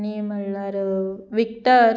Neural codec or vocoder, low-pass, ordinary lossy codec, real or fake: none; none; none; real